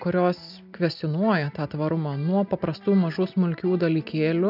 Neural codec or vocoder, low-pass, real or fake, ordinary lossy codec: none; 5.4 kHz; real; AAC, 48 kbps